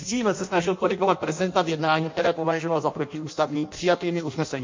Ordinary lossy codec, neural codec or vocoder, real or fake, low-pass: MP3, 48 kbps; codec, 16 kHz in and 24 kHz out, 0.6 kbps, FireRedTTS-2 codec; fake; 7.2 kHz